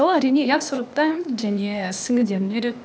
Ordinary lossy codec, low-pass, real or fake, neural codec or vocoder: none; none; fake; codec, 16 kHz, 0.8 kbps, ZipCodec